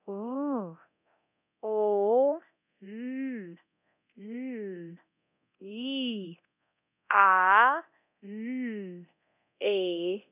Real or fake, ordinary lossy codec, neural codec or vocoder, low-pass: fake; none; codec, 24 kHz, 0.9 kbps, DualCodec; 3.6 kHz